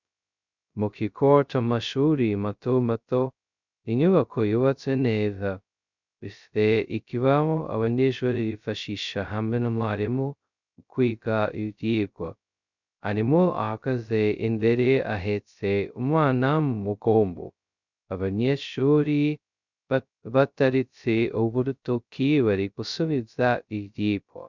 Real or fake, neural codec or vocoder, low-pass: fake; codec, 16 kHz, 0.2 kbps, FocalCodec; 7.2 kHz